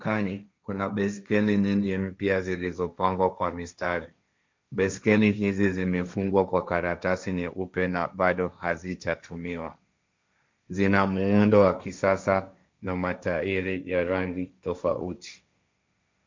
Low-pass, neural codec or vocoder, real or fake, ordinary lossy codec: 7.2 kHz; codec, 16 kHz, 1.1 kbps, Voila-Tokenizer; fake; MP3, 64 kbps